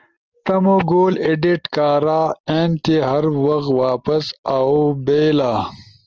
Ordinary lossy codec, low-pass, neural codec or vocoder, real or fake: Opus, 24 kbps; 7.2 kHz; none; real